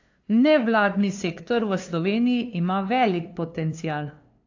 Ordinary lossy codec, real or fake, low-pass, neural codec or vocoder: AAC, 48 kbps; fake; 7.2 kHz; codec, 16 kHz, 2 kbps, FunCodec, trained on LibriTTS, 25 frames a second